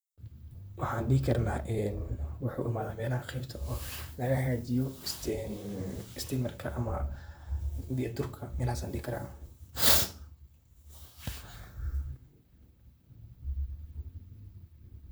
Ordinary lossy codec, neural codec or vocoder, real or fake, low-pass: none; vocoder, 44.1 kHz, 128 mel bands, Pupu-Vocoder; fake; none